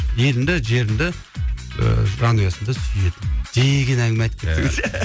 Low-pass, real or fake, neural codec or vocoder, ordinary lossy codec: none; real; none; none